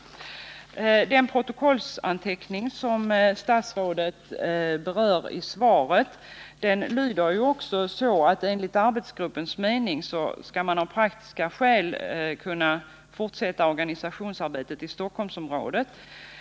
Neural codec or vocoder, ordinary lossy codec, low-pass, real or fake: none; none; none; real